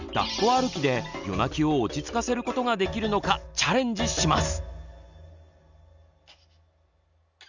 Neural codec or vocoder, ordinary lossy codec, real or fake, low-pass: none; none; real; 7.2 kHz